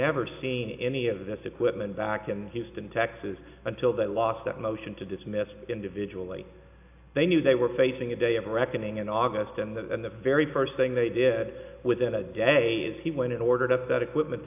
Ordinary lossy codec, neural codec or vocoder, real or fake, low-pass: AAC, 32 kbps; none; real; 3.6 kHz